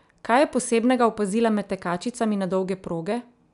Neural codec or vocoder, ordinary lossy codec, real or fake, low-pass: none; none; real; 10.8 kHz